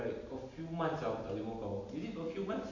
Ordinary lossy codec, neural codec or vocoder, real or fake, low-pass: none; none; real; 7.2 kHz